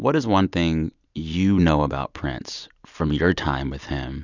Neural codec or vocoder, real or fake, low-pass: none; real; 7.2 kHz